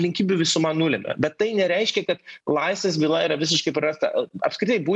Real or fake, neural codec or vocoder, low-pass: real; none; 10.8 kHz